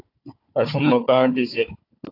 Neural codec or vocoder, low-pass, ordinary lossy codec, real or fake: codec, 16 kHz, 4 kbps, FunCodec, trained on Chinese and English, 50 frames a second; 5.4 kHz; AAC, 32 kbps; fake